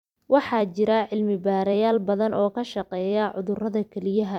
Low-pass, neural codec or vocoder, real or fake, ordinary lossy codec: 19.8 kHz; vocoder, 44.1 kHz, 128 mel bands every 256 samples, BigVGAN v2; fake; none